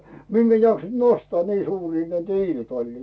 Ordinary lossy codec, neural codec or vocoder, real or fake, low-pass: none; none; real; none